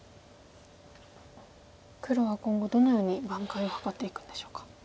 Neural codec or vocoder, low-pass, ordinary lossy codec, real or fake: none; none; none; real